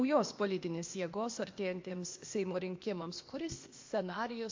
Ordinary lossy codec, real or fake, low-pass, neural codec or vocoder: MP3, 48 kbps; fake; 7.2 kHz; codec, 16 kHz, 0.8 kbps, ZipCodec